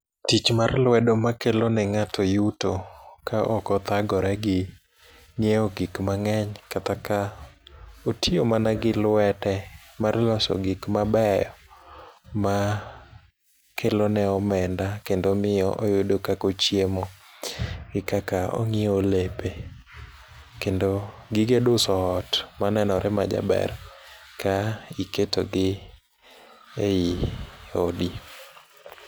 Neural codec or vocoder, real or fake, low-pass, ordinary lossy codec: none; real; none; none